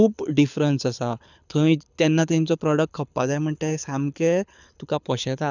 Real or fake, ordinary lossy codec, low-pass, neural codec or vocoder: fake; none; 7.2 kHz; codec, 24 kHz, 6 kbps, HILCodec